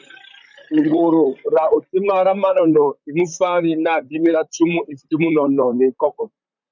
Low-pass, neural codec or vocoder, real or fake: 7.2 kHz; vocoder, 44.1 kHz, 128 mel bands, Pupu-Vocoder; fake